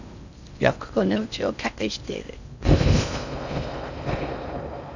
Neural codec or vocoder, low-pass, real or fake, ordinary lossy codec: codec, 16 kHz in and 24 kHz out, 0.6 kbps, FocalCodec, streaming, 4096 codes; 7.2 kHz; fake; none